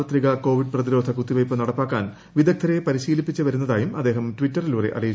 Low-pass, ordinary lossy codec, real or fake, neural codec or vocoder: none; none; real; none